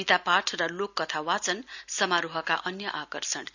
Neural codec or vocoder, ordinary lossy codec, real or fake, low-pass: none; none; real; 7.2 kHz